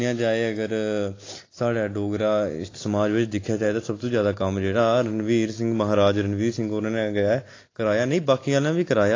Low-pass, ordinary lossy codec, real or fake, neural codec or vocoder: 7.2 kHz; AAC, 32 kbps; real; none